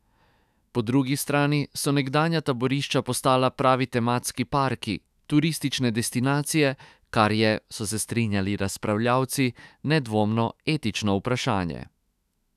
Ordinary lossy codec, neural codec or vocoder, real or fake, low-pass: none; autoencoder, 48 kHz, 128 numbers a frame, DAC-VAE, trained on Japanese speech; fake; 14.4 kHz